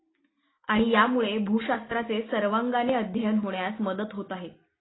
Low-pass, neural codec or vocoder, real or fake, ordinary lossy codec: 7.2 kHz; none; real; AAC, 16 kbps